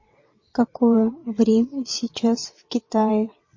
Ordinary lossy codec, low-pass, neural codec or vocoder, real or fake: MP3, 32 kbps; 7.2 kHz; vocoder, 22.05 kHz, 80 mel bands, WaveNeXt; fake